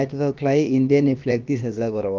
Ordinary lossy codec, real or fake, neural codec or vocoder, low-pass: Opus, 24 kbps; fake; codec, 16 kHz, about 1 kbps, DyCAST, with the encoder's durations; 7.2 kHz